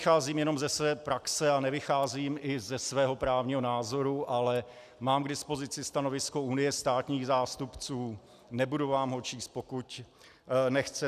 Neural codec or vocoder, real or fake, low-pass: none; real; 14.4 kHz